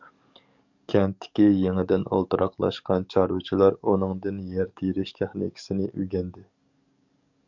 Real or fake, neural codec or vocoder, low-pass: fake; codec, 44.1 kHz, 7.8 kbps, DAC; 7.2 kHz